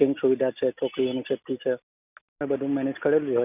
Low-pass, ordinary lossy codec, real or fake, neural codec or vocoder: 3.6 kHz; none; real; none